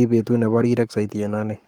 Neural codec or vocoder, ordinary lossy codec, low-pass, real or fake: codec, 44.1 kHz, 7.8 kbps, Pupu-Codec; Opus, 32 kbps; 19.8 kHz; fake